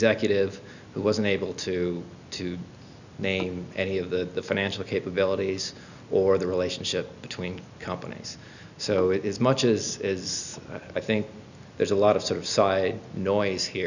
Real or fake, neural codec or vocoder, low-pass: real; none; 7.2 kHz